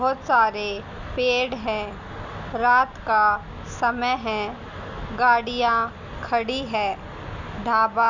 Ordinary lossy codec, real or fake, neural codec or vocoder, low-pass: none; real; none; 7.2 kHz